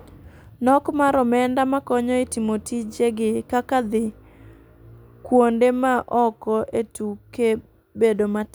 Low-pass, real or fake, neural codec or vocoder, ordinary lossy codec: none; real; none; none